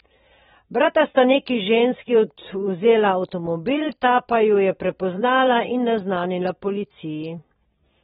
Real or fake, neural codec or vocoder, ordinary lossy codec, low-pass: fake; vocoder, 44.1 kHz, 128 mel bands every 512 samples, BigVGAN v2; AAC, 16 kbps; 19.8 kHz